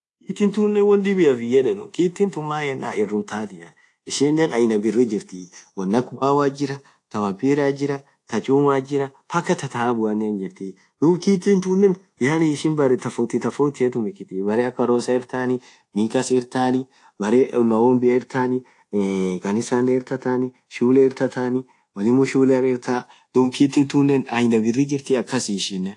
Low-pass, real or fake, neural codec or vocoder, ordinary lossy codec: 10.8 kHz; fake; codec, 24 kHz, 1.2 kbps, DualCodec; AAC, 48 kbps